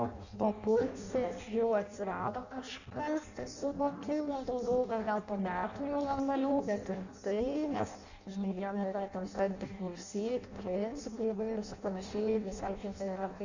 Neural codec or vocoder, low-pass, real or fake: codec, 16 kHz in and 24 kHz out, 0.6 kbps, FireRedTTS-2 codec; 7.2 kHz; fake